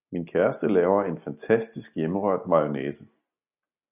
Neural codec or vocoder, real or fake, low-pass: none; real; 3.6 kHz